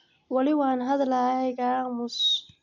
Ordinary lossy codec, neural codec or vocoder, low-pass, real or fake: MP3, 48 kbps; none; 7.2 kHz; real